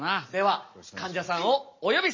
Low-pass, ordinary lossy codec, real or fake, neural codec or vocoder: 7.2 kHz; MP3, 32 kbps; real; none